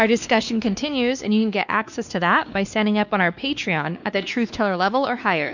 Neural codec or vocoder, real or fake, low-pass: codec, 16 kHz, 2 kbps, X-Codec, WavLM features, trained on Multilingual LibriSpeech; fake; 7.2 kHz